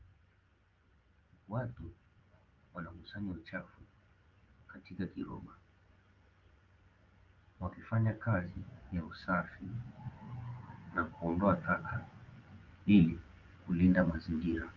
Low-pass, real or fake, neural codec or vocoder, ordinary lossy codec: 7.2 kHz; fake; vocoder, 24 kHz, 100 mel bands, Vocos; Opus, 32 kbps